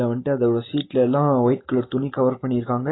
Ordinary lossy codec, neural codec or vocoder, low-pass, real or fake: AAC, 16 kbps; none; 7.2 kHz; real